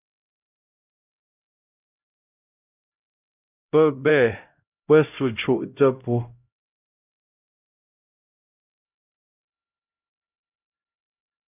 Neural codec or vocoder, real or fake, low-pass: codec, 16 kHz, 0.5 kbps, X-Codec, HuBERT features, trained on LibriSpeech; fake; 3.6 kHz